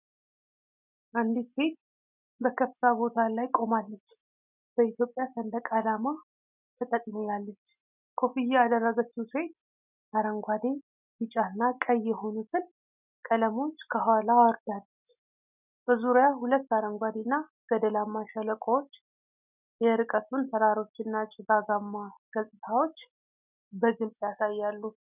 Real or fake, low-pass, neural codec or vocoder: real; 3.6 kHz; none